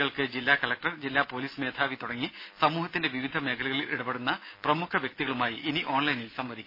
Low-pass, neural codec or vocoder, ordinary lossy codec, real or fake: 5.4 kHz; none; MP3, 24 kbps; real